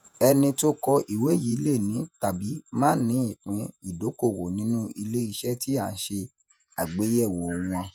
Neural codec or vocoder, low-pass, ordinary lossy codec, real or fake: vocoder, 48 kHz, 128 mel bands, Vocos; 19.8 kHz; none; fake